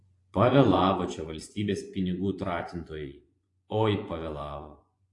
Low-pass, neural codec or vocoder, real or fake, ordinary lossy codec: 10.8 kHz; vocoder, 24 kHz, 100 mel bands, Vocos; fake; AAC, 48 kbps